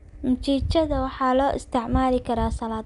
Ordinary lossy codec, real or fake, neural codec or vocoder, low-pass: none; real; none; 10.8 kHz